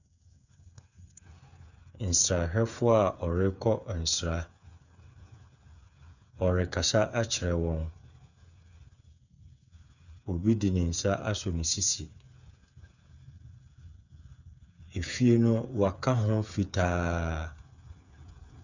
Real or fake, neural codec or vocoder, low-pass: fake; codec, 16 kHz, 8 kbps, FreqCodec, smaller model; 7.2 kHz